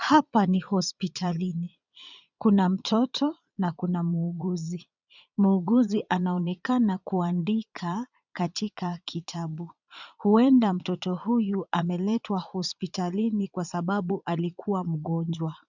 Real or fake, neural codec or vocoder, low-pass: fake; vocoder, 22.05 kHz, 80 mel bands, Vocos; 7.2 kHz